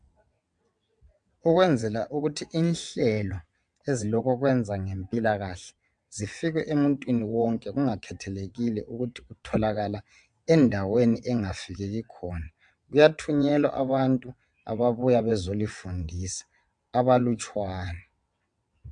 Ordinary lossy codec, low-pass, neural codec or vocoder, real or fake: MP3, 64 kbps; 9.9 kHz; vocoder, 22.05 kHz, 80 mel bands, WaveNeXt; fake